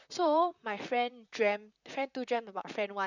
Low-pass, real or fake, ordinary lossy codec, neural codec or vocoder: 7.2 kHz; fake; none; vocoder, 44.1 kHz, 128 mel bands, Pupu-Vocoder